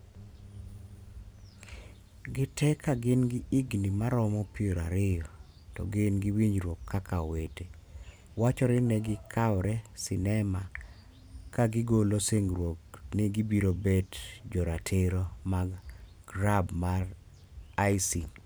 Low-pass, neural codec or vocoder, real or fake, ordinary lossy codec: none; vocoder, 44.1 kHz, 128 mel bands every 512 samples, BigVGAN v2; fake; none